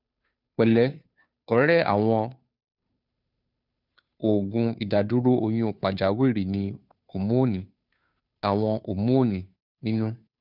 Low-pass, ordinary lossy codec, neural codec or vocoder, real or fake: 5.4 kHz; none; codec, 16 kHz, 2 kbps, FunCodec, trained on Chinese and English, 25 frames a second; fake